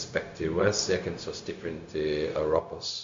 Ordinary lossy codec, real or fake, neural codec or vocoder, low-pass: MP3, 48 kbps; fake; codec, 16 kHz, 0.4 kbps, LongCat-Audio-Codec; 7.2 kHz